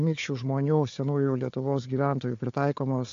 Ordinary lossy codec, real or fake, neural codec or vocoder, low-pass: AAC, 48 kbps; fake; codec, 16 kHz, 4 kbps, FunCodec, trained on Chinese and English, 50 frames a second; 7.2 kHz